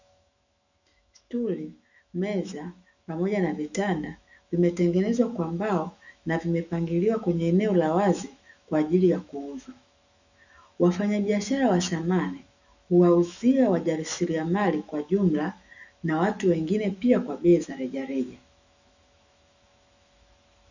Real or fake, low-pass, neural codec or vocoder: fake; 7.2 kHz; autoencoder, 48 kHz, 128 numbers a frame, DAC-VAE, trained on Japanese speech